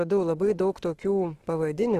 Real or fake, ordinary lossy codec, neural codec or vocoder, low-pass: fake; Opus, 16 kbps; autoencoder, 48 kHz, 32 numbers a frame, DAC-VAE, trained on Japanese speech; 14.4 kHz